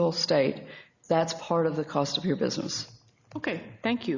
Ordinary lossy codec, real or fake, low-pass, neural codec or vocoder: Opus, 64 kbps; real; 7.2 kHz; none